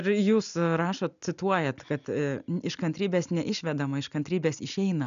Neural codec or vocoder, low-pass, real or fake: none; 7.2 kHz; real